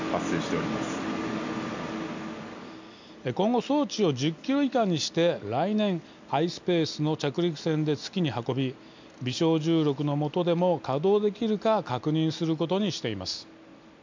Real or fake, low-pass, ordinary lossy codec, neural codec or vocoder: real; 7.2 kHz; MP3, 64 kbps; none